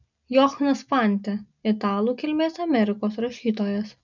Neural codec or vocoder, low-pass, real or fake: none; 7.2 kHz; real